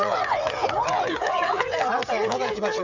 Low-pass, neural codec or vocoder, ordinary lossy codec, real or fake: 7.2 kHz; codec, 16 kHz, 8 kbps, FreqCodec, smaller model; Opus, 64 kbps; fake